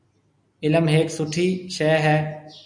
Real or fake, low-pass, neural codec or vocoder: real; 9.9 kHz; none